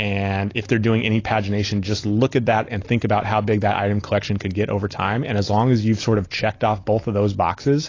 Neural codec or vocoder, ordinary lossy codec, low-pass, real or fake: none; AAC, 32 kbps; 7.2 kHz; real